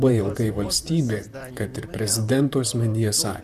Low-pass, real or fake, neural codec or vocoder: 14.4 kHz; fake; vocoder, 44.1 kHz, 128 mel bands, Pupu-Vocoder